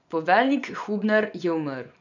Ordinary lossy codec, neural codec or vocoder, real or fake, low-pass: none; vocoder, 24 kHz, 100 mel bands, Vocos; fake; 7.2 kHz